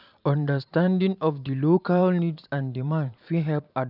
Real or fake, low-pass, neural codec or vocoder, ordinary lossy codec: fake; 5.4 kHz; vocoder, 44.1 kHz, 128 mel bands every 512 samples, BigVGAN v2; none